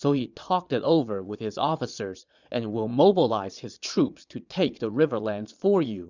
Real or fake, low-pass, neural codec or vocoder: fake; 7.2 kHz; vocoder, 22.05 kHz, 80 mel bands, Vocos